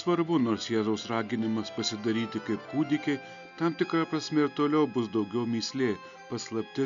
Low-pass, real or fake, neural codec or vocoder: 7.2 kHz; real; none